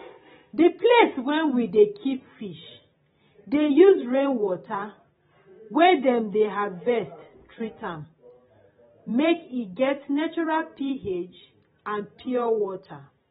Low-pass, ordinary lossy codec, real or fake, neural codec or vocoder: 19.8 kHz; AAC, 16 kbps; fake; vocoder, 44.1 kHz, 128 mel bands every 512 samples, BigVGAN v2